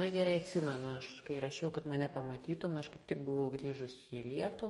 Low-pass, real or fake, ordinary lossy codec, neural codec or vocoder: 19.8 kHz; fake; MP3, 48 kbps; codec, 44.1 kHz, 2.6 kbps, DAC